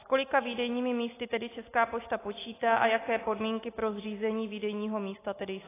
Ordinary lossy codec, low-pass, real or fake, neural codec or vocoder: AAC, 16 kbps; 3.6 kHz; real; none